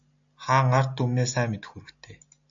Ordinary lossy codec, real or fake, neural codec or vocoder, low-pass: AAC, 64 kbps; real; none; 7.2 kHz